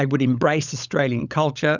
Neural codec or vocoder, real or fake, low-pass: codec, 16 kHz, 16 kbps, FunCodec, trained on LibriTTS, 50 frames a second; fake; 7.2 kHz